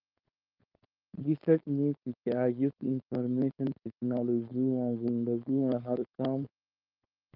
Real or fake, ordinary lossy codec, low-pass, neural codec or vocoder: fake; Opus, 24 kbps; 5.4 kHz; codec, 16 kHz, 4.8 kbps, FACodec